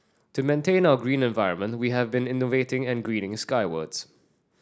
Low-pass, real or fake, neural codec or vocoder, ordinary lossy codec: none; real; none; none